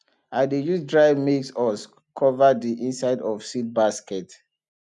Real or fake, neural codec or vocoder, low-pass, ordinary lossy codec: real; none; 10.8 kHz; none